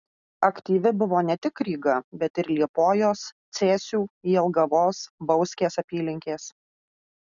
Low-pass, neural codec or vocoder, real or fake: 7.2 kHz; none; real